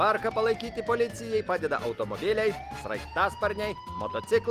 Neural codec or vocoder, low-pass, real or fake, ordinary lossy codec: none; 14.4 kHz; real; Opus, 24 kbps